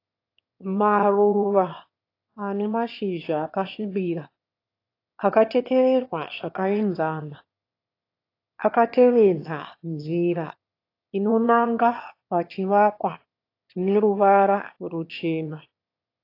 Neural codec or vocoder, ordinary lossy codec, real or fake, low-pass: autoencoder, 22.05 kHz, a latent of 192 numbers a frame, VITS, trained on one speaker; AAC, 32 kbps; fake; 5.4 kHz